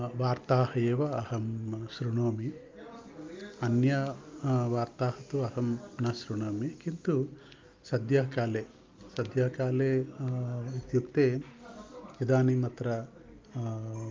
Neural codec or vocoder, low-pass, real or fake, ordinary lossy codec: none; 7.2 kHz; real; Opus, 24 kbps